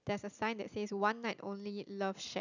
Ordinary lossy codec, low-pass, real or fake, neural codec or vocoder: none; 7.2 kHz; real; none